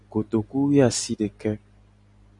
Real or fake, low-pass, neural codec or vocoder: real; 10.8 kHz; none